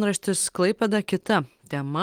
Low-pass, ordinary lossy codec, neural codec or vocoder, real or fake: 19.8 kHz; Opus, 24 kbps; none; real